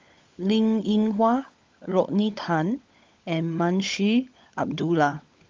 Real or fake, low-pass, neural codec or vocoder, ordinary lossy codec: fake; 7.2 kHz; codec, 16 kHz, 16 kbps, FunCodec, trained on LibriTTS, 50 frames a second; Opus, 32 kbps